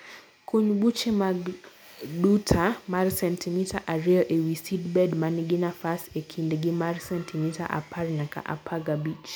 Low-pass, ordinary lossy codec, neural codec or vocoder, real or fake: none; none; none; real